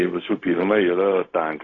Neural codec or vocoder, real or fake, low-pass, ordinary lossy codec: codec, 16 kHz, 0.4 kbps, LongCat-Audio-Codec; fake; 7.2 kHz; AAC, 32 kbps